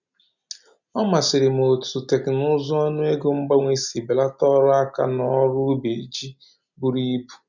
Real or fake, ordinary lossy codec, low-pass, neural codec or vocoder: real; none; 7.2 kHz; none